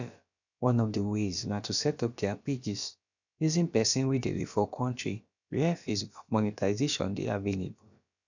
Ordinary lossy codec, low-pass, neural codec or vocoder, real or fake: none; 7.2 kHz; codec, 16 kHz, about 1 kbps, DyCAST, with the encoder's durations; fake